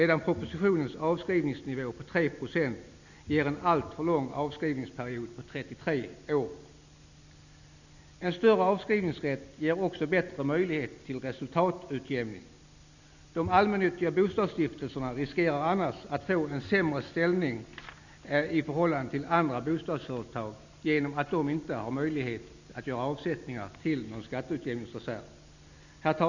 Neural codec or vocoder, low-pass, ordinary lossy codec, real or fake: none; 7.2 kHz; none; real